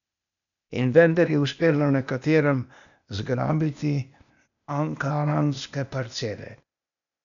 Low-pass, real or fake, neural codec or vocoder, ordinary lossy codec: 7.2 kHz; fake; codec, 16 kHz, 0.8 kbps, ZipCodec; none